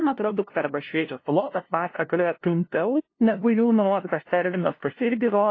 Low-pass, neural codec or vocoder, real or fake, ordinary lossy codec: 7.2 kHz; codec, 16 kHz, 0.5 kbps, FunCodec, trained on LibriTTS, 25 frames a second; fake; AAC, 32 kbps